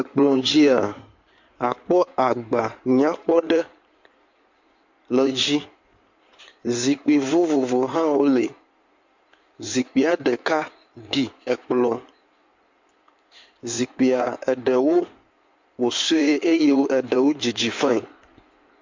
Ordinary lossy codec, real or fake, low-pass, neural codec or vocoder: MP3, 48 kbps; fake; 7.2 kHz; codec, 16 kHz in and 24 kHz out, 2.2 kbps, FireRedTTS-2 codec